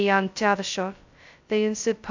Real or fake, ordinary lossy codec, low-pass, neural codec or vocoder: fake; AAC, 48 kbps; 7.2 kHz; codec, 16 kHz, 0.2 kbps, FocalCodec